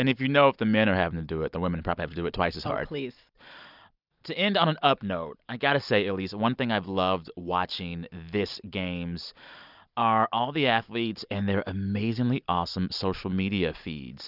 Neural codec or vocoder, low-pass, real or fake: none; 5.4 kHz; real